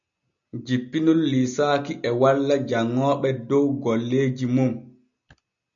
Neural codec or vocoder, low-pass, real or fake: none; 7.2 kHz; real